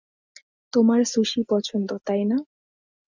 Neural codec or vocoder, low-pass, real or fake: none; 7.2 kHz; real